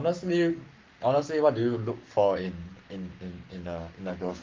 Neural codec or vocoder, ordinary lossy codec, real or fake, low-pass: codec, 44.1 kHz, 7.8 kbps, Pupu-Codec; Opus, 24 kbps; fake; 7.2 kHz